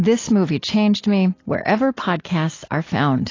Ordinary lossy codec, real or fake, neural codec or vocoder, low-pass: AAC, 32 kbps; real; none; 7.2 kHz